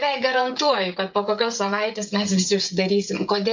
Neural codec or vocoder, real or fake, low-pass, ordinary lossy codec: codec, 16 kHz, 16 kbps, FreqCodec, smaller model; fake; 7.2 kHz; MP3, 64 kbps